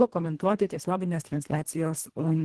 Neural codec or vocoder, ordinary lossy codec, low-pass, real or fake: codec, 24 kHz, 1.5 kbps, HILCodec; Opus, 16 kbps; 10.8 kHz; fake